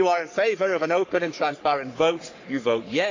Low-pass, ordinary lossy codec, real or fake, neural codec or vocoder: 7.2 kHz; AAC, 48 kbps; fake; codec, 44.1 kHz, 3.4 kbps, Pupu-Codec